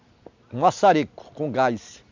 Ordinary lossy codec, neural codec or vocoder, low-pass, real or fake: MP3, 64 kbps; none; 7.2 kHz; real